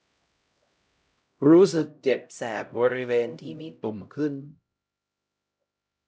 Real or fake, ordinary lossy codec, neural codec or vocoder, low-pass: fake; none; codec, 16 kHz, 0.5 kbps, X-Codec, HuBERT features, trained on LibriSpeech; none